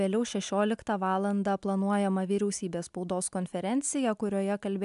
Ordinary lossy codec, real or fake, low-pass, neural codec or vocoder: MP3, 96 kbps; real; 10.8 kHz; none